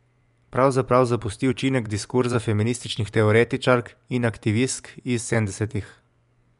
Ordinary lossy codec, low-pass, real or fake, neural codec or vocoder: none; 10.8 kHz; fake; vocoder, 24 kHz, 100 mel bands, Vocos